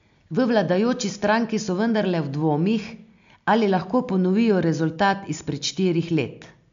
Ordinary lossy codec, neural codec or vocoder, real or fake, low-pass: MP3, 64 kbps; none; real; 7.2 kHz